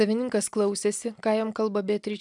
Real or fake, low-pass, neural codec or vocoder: real; 10.8 kHz; none